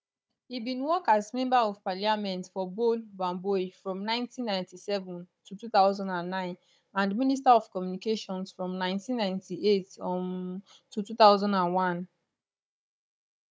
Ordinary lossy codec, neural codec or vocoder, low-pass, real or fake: none; codec, 16 kHz, 16 kbps, FunCodec, trained on Chinese and English, 50 frames a second; none; fake